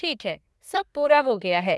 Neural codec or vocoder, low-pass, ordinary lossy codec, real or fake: codec, 24 kHz, 1 kbps, SNAC; none; none; fake